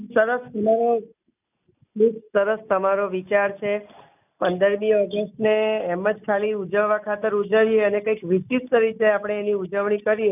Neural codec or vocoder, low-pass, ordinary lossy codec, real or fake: none; 3.6 kHz; none; real